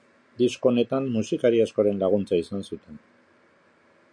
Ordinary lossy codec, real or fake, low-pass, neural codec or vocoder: MP3, 64 kbps; real; 9.9 kHz; none